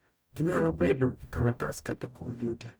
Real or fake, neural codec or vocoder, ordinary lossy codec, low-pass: fake; codec, 44.1 kHz, 0.9 kbps, DAC; none; none